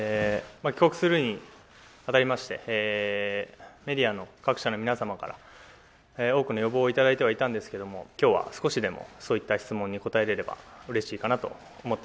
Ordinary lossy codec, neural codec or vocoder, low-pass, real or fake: none; none; none; real